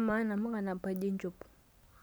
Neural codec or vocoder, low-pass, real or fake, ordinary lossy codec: none; none; real; none